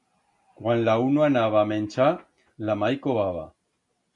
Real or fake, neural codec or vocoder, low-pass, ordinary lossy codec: real; none; 10.8 kHz; MP3, 64 kbps